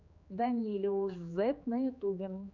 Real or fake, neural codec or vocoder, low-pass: fake; codec, 16 kHz, 4 kbps, X-Codec, HuBERT features, trained on general audio; 7.2 kHz